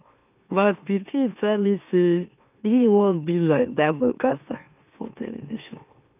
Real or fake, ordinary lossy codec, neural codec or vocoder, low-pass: fake; none; autoencoder, 44.1 kHz, a latent of 192 numbers a frame, MeloTTS; 3.6 kHz